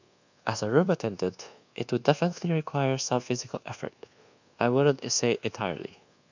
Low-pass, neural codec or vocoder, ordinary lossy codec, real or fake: 7.2 kHz; codec, 24 kHz, 1.2 kbps, DualCodec; none; fake